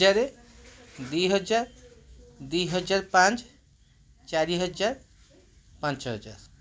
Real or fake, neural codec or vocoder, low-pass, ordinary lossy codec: real; none; none; none